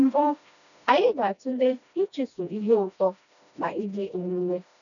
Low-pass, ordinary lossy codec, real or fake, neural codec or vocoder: 7.2 kHz; none; fake; codec, 16 kHz, 1 kbps, FreqCodec, smaller model